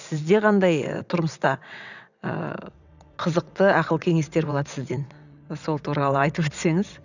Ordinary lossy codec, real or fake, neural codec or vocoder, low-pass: none; fake; vocoder, 22.05 kHz, 80 mel bands, WaveNeXt; 7.2 kHz